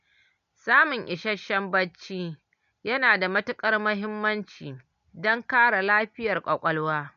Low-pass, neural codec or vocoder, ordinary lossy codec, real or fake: 7.2 kHz; none; MP3, 96 kbps; real